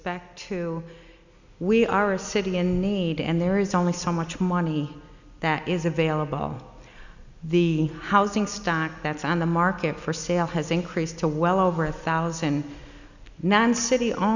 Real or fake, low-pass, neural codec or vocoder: real; 7.2 kHz; none